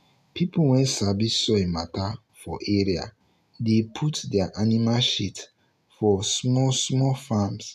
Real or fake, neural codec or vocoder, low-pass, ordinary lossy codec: real; none; 14.4 kHz; none